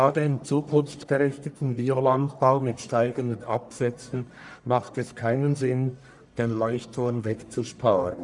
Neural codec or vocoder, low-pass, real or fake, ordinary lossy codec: codec, 44.1 kHz, 1.7 kbps, Pupu-Codec; 10.8 kHz; fake; none